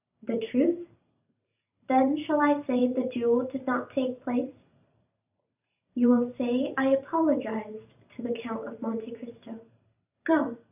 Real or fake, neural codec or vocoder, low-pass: fake; vocoder, 44.1 kHz, 128 mel bands every 512 samples, BigVGAN v2; 3.6 kHz